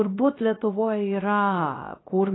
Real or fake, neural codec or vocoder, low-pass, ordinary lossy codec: fake; codec, 16 kHz, 0.3 kbps, FocalCodec; 7.2 kHz; AAC, 16 kbps